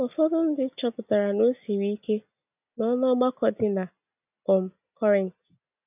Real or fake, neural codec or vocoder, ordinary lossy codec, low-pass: real; none; none; 3.6 kHz